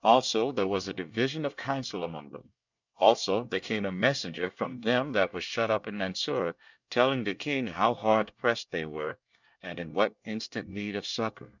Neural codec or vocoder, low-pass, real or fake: codec, 24 kHz, 1 kbps, SNAC; 7.2 kHz; fake